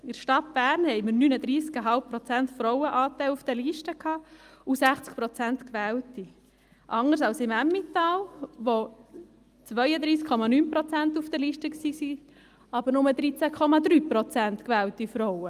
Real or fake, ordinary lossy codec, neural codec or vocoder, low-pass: real; Opus, 32 kbps; none; 14.4 kHz